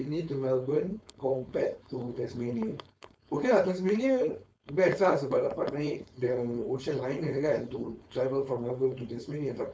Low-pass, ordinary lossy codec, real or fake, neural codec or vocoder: none; none; fake; codec, 16 kHz, 4.8 kbps, FACodec